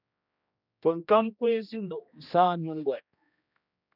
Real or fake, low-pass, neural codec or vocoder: fake; 5.4 kHz; codec, 16 kHz, 1 kbps, X-Codec, HuBERT features, trained on general audio